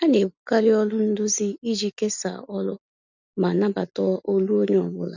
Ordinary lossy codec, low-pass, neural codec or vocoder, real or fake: none; 7.2 kHz; none; real